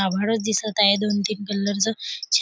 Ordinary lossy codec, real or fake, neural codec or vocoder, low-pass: none; real; none; none